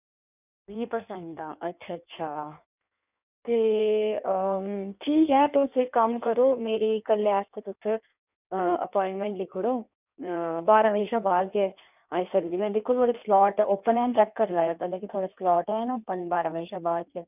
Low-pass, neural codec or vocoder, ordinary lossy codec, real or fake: 3.6 kHz; codec, 16 kHz in and 24 kHz out, 1.1 kbps, FireRedTTS-2 codec; none; fake